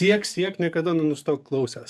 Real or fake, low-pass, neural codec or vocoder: fake; 14.4 kHz; codec, 44.1 kHz, 7.8 kbps, DAC